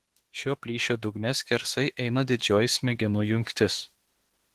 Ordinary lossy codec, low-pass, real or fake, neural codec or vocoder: Opus, 16 kbps; 14.4 kHz; fake; autoencoder, 48 kHz, 32 numbers a frame, DAC-VAE, trained on Japanese speech